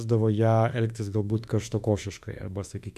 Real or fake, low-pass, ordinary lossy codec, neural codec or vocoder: fake; 14.4 kHz; MP3, 96 kbps; autoencoder, 48 kHz, 32 numbers a frame, DAC-VAE, trained on Japanese speech